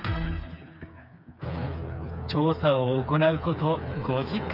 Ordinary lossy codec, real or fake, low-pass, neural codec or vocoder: none; fake; 5.4 kHz; codec, 16 kHz, 4 kbps, FreqCodec, smaller model